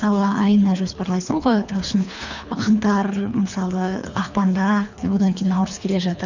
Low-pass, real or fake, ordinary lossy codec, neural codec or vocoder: 7.2 kHz; fake; none; codec, 24 kHz, 3 kbps, HILCodec